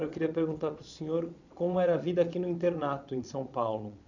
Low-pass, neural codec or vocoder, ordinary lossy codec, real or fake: 7.2 kHz; vocoder, 22.05 kHz, 80 mel bands, Vocos; none; fake